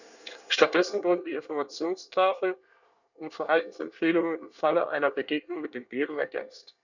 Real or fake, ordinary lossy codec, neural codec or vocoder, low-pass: fake; none; codec, 24 kHz, 1 kbps, SNAC; 7.2 kHz